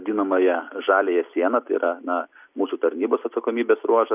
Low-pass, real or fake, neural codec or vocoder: 3.6 kHz; real; none